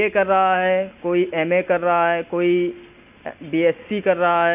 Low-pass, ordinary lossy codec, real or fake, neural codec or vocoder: 3.6 kHz; none; real; none